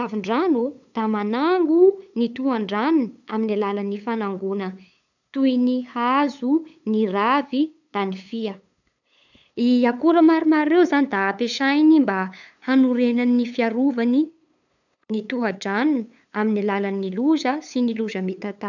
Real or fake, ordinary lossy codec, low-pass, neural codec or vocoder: fake; none; 7.2 kHz; codec, 16 kHz, 8 kbps, FunCodec, trained on LibriTTS, 25 frames a second